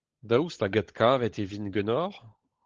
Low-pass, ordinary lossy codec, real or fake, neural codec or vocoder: 7.2 kHz; Opus, 16 kbps; fake; codec, 16 kHz, 16 kbps, FunCodec, trained on LibriTTS, 50 frames a second